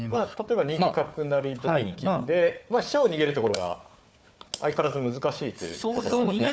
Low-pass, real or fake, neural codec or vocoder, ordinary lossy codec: none; fake; codec, 16 kHz, 4 kbps, FunCodec, trained on Chinese and English, 50 frames a second; none